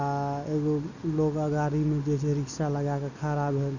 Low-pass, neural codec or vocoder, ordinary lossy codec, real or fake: 7.2 kHz; none; none; real